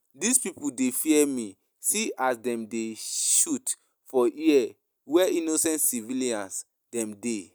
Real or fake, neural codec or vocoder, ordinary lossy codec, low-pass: real; none; none; none